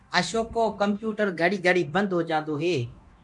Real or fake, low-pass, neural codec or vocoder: fake; 10.8 kHz; codec, 24 kHz, 0.9 kbps, DualCodec